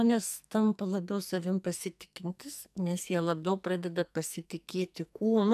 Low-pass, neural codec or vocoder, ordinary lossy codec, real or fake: 14.4 kHz; codec, 44.1 kHz, 2.6 kbps, SNAC; AAC, 96 kbps; fake